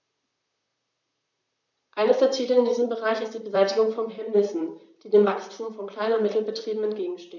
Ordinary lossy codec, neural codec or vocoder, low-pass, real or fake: none; vocoder, 44.1 kHz, 128 mel bands, Pupu-Vocoder; 7.2 kHz; fake